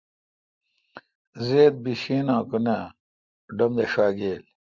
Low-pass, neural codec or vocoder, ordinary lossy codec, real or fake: 7.2 kHz; none; Opus, 64 kbps; real